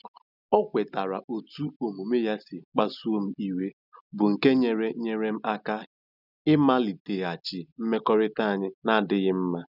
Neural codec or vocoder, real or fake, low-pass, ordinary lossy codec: none; real; 5.4 kHz; none